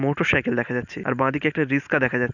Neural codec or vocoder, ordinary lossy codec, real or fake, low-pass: none; none; real; 7.2 kHz